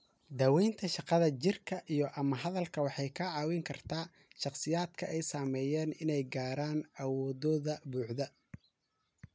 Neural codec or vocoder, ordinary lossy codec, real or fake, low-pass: none; none; real; none